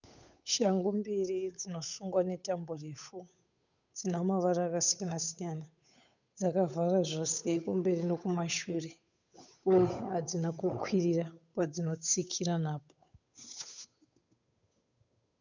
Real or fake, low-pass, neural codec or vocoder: fake; 7.2 kHz; codec, 16 kHz, 8 kbps, FunCodec, trained on Chinese and English, 25 frames a second